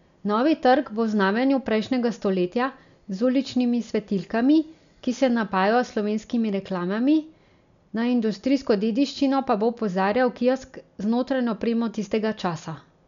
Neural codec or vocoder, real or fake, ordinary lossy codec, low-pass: none; real; none; 7.2 kHz